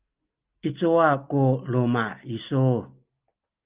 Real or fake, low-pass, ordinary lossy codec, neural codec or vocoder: real; 3.6 kHz; Opus, 32 kbps; none